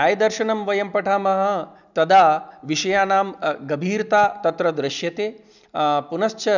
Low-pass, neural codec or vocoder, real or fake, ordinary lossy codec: 7.2 kHz; none; real; none